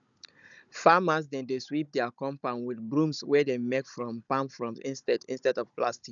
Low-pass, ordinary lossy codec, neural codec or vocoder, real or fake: 7.2 kHz; none; codec, 16 kHz, 16 kbps, FunCodec, trained on Chinese and English, 50 frames a second; fake